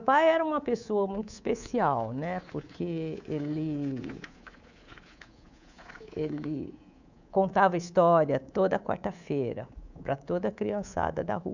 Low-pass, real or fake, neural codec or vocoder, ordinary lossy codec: 7.2 kHz; fake; codec, 24 kHz, 3.1 kbps, DualCodec; none